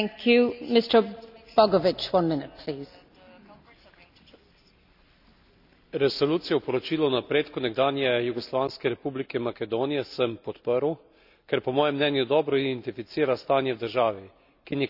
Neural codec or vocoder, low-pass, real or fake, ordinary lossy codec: none; 5.4 kHz; real; none